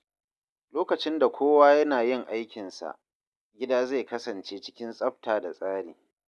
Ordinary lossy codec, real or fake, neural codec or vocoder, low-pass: none; real; none; none